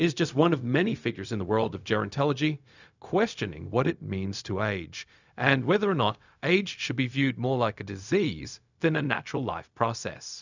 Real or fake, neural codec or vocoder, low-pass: fake; codec, 16 kHz, 0.4 kbps, LongCat-Audio-Codec; 7.2 kHz